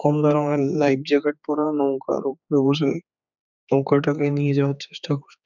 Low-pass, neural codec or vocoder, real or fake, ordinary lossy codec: 7.2 kHz; codec, 16 kHz, 4 kbps, X-Codec, HuBERT features, trained on general audio; fake; none